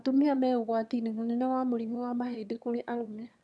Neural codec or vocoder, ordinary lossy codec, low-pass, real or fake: autoencoder, 22.05 kHz, a latent of 192 numbers a frame, VITS, trained on one speaker; none; none; fake